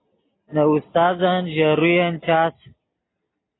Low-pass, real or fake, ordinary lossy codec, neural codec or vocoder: 7.2 kHz; real; AAC, 16 kbps; none